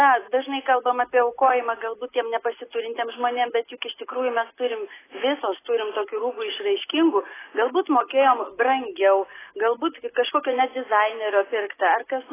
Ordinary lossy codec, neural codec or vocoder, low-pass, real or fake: AAC, 16 kbps; none; 3.6 kHz; real